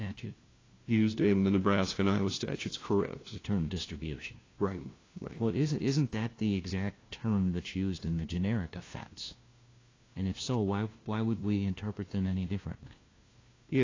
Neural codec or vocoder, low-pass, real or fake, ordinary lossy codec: codec, 16 kHz, 1 kbps, FunCodec, trained on LibriTTS, 50 frames a second; 7.2 kHz; fake; AAC, 32 kbps